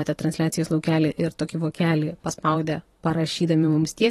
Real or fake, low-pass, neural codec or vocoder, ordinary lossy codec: fake; 19.8 kHz; vocoder, 44.1 kHz, 128 mel bands every 512 samples, BigVGAN v2; AAC, 32 kbps